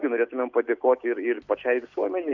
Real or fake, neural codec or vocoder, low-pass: real; none; 7.2 kHz